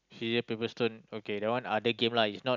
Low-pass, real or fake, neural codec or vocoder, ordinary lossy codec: 7.2 kHz; real; none; none